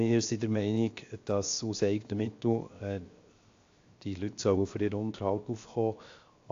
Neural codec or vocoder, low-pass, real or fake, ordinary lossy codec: codec, 16 kHz, 0.7 kbps, FocalCodec; 7.2 kHz; fake; AAC, 48 kbps